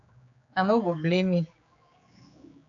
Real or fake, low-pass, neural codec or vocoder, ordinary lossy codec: fake; 7.2 kHz; codec, 16 kHz, 4 kbps, X-Codec, HuBERT features, trained on general audio; AAC, 64 kbps